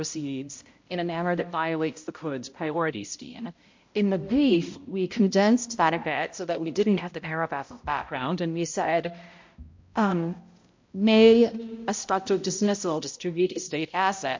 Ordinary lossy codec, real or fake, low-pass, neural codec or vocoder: MP3, 48 kbps; fake; 7.2 kHz; codec, 16 kHz, 0.5 kbps, X-Codec, HuBERT features, trained on balanced general audio